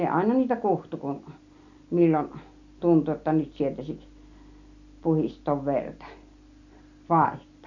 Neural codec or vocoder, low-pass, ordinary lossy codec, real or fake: none; 7.2 kHz; none; real